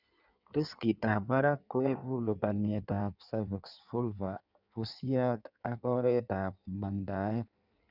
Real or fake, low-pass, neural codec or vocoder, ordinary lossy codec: fake; 5.4 kHz; codec, 16 kHz in and 24 kHz out, 1.1 kbps, FireRedTTS-2 codec; none